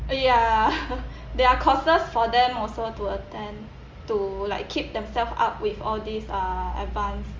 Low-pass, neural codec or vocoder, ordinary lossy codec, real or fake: 7.2 kHz; none; Opus, 32 kbps; real